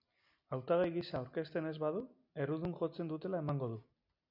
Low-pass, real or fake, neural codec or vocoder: 5.4 kHz; real; none